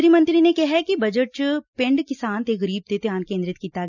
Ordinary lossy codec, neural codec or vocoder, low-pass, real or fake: none; none; 7.2 kHz; real